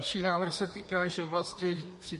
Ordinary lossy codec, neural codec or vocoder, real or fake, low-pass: MP3, 48 kbps; codec, 24 kHz, 1 kbps, SNAC; fake; 10.8 kHz